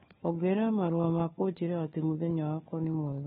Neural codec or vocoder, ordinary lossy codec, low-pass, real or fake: codec, 16 kHz, 4 kbps, FunCodec, trained on LibriTTS, 50 frames a second; AAC, 16 kbps; 7.2 kHz; fake